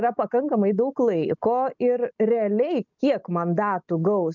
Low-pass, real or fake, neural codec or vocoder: 7.2 kHz; real; none